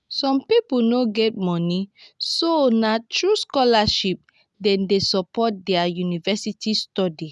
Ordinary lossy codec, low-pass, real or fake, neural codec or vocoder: none; none; real; none